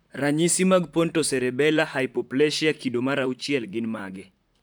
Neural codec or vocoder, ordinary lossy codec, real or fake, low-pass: vocoder, 44.1 kHz, 128 mel bands, Pupu-Vocoder; none; fake; none